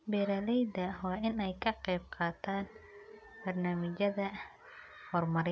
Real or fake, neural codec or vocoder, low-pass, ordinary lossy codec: real; none; none; none